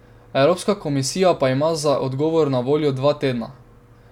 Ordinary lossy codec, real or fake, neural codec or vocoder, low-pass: none; real; none; 19.8 kHz